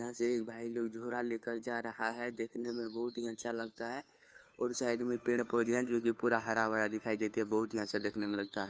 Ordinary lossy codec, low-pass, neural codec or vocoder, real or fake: none; none; codec, 16 kHz, 2 kbps, FunCodec, trained on Chinese and English, 25 frames a second; fake